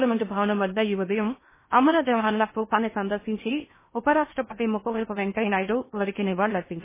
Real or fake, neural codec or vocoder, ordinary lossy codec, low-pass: fake; codec, 16 kHz in and 24 kHz out, 0.8 kbps, FocalCodec, streaming, 65536 codes; MP3, 16 kbps; 3.6 kHz